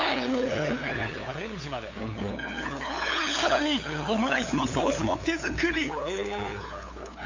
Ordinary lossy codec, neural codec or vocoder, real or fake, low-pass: AAC, 48 kbps; codec, 16 kHz, 8 kbps, FunCodec, trained on LibriTTS, 25 frames a second; fake; 7.2 kHz